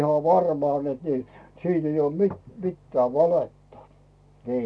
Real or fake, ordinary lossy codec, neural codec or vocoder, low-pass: real; none; none; none